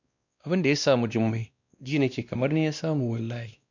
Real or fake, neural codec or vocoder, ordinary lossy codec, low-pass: fake; codec, 16 kHz, 1 kbps, X-Codec, WavLM features, trained on Multilingual LibriSpeech; none; 7.2 kHz